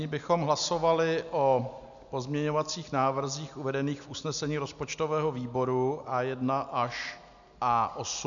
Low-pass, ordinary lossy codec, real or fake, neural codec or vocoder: 7.2 kHz; MP3, 96 kbps; real; none